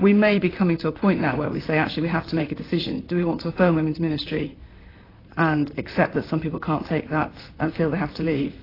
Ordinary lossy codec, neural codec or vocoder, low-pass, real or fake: AAC, 24 kbps; vocoder, 44.1 kHz, 128 mel bands, Pupu-Vocoder; 5.4 kHz; fake